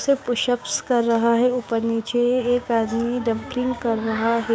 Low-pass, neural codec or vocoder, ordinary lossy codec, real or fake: none; codec, 16 kHz, 6 kbps, DAC; none; fake